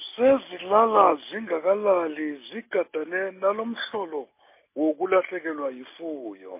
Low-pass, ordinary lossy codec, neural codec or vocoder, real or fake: 3.6 kHz; MP3, 24 kbps; none; real